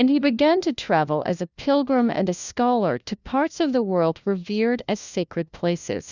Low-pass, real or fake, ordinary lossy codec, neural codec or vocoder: 7.2 kHz; fake; Opus, 64 kbps; codec, 16 kHz, 1 kbps, FunCodec, trained on LibriTTS, 50 frames a second